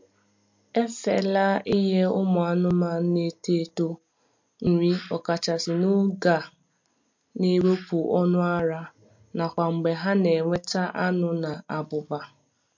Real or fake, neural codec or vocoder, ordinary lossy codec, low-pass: real; none; MP3, 48 kbps; 7.2 kHz